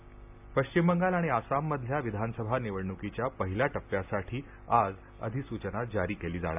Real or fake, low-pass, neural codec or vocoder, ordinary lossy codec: real; 3.6 kHz; none; AAC, 32 kbps